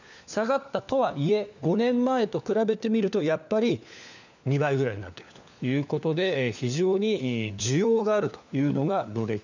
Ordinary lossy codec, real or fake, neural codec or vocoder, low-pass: none; fake; codec, 16 kHz, 4 kbps, FunCodec, trained on LibriTTS, 50 frames a second; 7.2 kHz